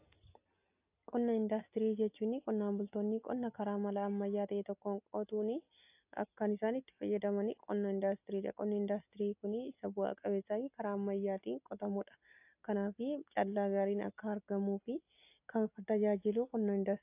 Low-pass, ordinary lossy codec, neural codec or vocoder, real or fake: 3.6 kHz; AAC, 32 kbps; none; real